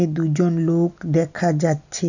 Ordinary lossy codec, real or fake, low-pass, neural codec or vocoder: none; real; 7.2 kHz; none